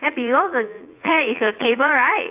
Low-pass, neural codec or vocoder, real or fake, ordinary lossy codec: 3.6 kHz; vocoder, 44.1 kHz, 80 mel bands, Vocos; fake; AAC, 32 kbps